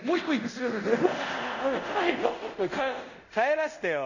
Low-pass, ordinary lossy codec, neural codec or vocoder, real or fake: 7.2 kHz; none; codec, 24 kHz, 0.5 kbps, DualCodec; fake